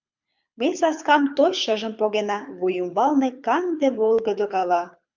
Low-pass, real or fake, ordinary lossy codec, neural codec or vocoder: 7.2 kHz; fake; MP3, 64 kbps; codec, 24 kHz, 6 kbps, HILCodec